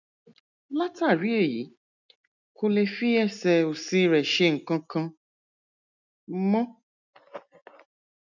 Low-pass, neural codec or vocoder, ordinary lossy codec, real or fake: 7.2 kHz; none; none; real